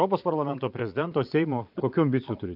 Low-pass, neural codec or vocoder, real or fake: 5.4 kHz; vocoder, 22.05 kHz, 80 mel bands, Vocos; fake